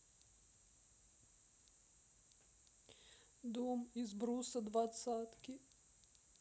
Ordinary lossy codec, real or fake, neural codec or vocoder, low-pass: none; real; none; none